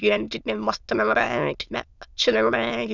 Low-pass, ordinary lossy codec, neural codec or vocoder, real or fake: 7.2 kHz; none; autoencoder, 22.05 kHz, a latent of 192 numbers a frame, VITS, trained on many speakers; fake